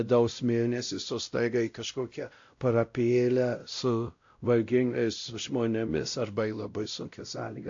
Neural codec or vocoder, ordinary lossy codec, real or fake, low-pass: codec, 16 kHz, 0.5 kbps, X-Codec, WavLM features, trained on Multilingual LibriSpeech; AAC, 48 kbps; fake; 7.2 kHz